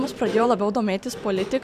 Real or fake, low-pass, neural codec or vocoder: fake; 14.4 kHz; vocoder, 44.1 kHz, 128 mel bands every 512 samples, BigVGAN v2